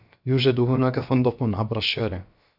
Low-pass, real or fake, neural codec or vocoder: 5.4 kHz; fake; codec, 16 kHz, about 1 kbps, DyCAST, with the encoder's durations